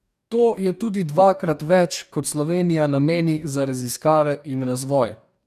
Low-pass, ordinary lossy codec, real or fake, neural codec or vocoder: 14.4 kHz; none; fake; codec, 44.1 kHz, 2.6 kbps, DAC